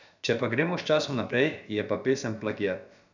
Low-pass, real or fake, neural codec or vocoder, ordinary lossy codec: 7.2 kHz; fake; codec, 16 kHz, about 1 kbps, DyCAST, with the encoder's durations; none